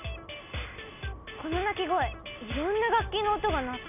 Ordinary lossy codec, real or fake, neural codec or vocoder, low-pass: none; real; none; 3.6 kHz